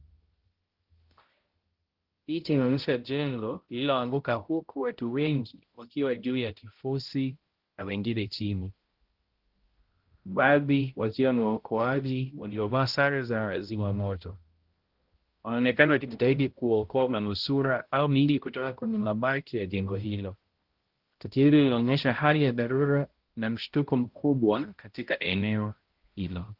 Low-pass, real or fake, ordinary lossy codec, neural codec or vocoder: 5.4 kHz; fake; Opus, 16 kbps; codec, 16 kHz, 0.5 kbps, X-Codec, HuBERT features, trained on balanced general audio